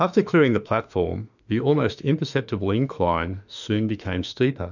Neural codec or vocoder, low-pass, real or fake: autoencoder, 48 kHz, 32 numbers a frame, DAC-VAE, trained on Japanese speech; 7.2 kHz; fake